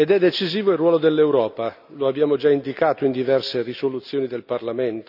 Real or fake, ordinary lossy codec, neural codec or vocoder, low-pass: real; none; none; 5.4 kHz